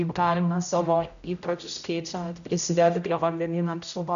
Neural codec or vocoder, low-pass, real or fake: codec, 16 kHz, 0.5 kbps, X-Codec, HuBERT features, trained on general audio; 7.2 kHz; fake